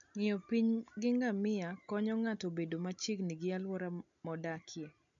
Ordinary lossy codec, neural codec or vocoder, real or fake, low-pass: none; none; real; 7.2 kHz